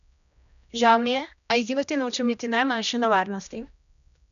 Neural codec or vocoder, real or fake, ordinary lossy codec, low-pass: codec, 16 kHz, 1 kbps, X-Codec, HuBERT features, trained on general audio; fake; none; 7.2 kHz